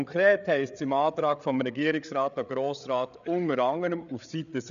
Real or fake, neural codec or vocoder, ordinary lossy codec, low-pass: fake; codec, 16 kHz, 8 kbps, FreqCodec, larger model; none; 7.2 kHz